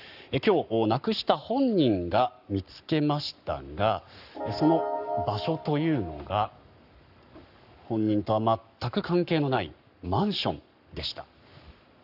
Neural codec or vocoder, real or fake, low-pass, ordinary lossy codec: codec, 44.1 kHz, 7.8 kbps, Pupu-Codec; fake; 5.4 kHz; none